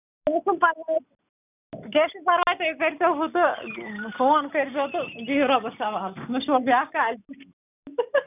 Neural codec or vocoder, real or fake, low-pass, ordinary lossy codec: none; real; 3.6 kHz; none